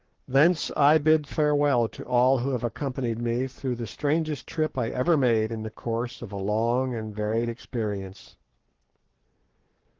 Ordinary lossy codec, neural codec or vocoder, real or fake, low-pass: Opus, 16 kbps; codec, 44.1 kHz, 7.8 kbps, Pupu-Codec; fake; 7.2 kHz